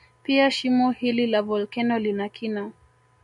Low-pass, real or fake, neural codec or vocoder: 10.8 kHz; real; none